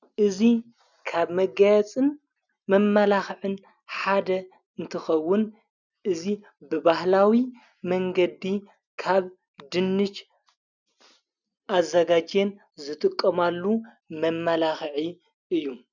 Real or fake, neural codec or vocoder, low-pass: real; none; 7.2 kHz